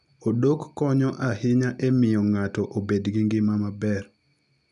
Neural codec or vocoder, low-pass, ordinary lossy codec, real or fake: none; 10.8 kHz; none; real